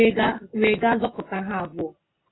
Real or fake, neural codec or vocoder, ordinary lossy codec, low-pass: real; none; AAC, 16 kbps; 7.2 kHz